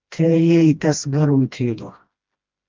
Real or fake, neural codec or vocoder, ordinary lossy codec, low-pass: fake; codec, 16 kHz, 1 kbps, FreqCodec, smaller model; Opus, 24 kbps; 7.2 kHz